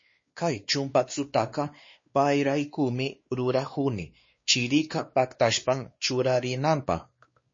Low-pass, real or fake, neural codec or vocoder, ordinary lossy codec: 7.2 kHz; fake; codec, 16 kHz, 2 kbps, X-Codec, WavLM features, trained on Multilingual LibriSpeech; MP3, 32 kbps